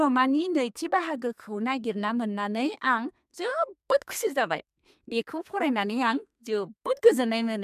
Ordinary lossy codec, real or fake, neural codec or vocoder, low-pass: MP3, 96 kbps; fake; codec, 32 kHz, 1.9 kbps, SNAC; 14.4 kHz